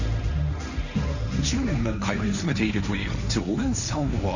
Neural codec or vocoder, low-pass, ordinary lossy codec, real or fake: codec, 16 kHz, 1.1 kbps, Voila-Tokenizer; 7.2 kHz; none; fake